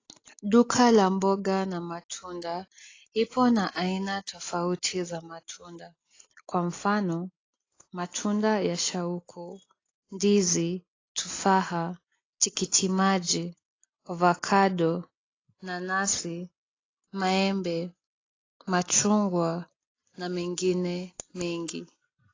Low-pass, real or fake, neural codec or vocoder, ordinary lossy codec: 7.2 kHz; real; none; AAC, 32 kbps